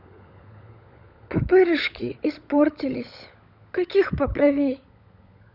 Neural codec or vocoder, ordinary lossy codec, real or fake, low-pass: codec, 16 kHz, 16 kbps, FunCodec, trained on LibriTTS, 50 frames a second; none; fake; 5.4 kHz